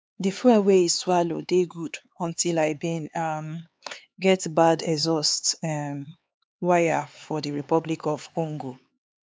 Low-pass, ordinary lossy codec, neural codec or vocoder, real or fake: none; none; codec, 16 kHz, 4 kbps, X-Codec, HuBERT features, trained on LibriSpeech; fake